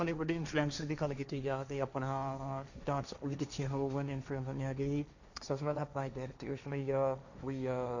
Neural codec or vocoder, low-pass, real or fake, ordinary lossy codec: codec, 16 kHz, 1.1 kbps, Voila-Tokenizer; 7.2 kHz; fake; none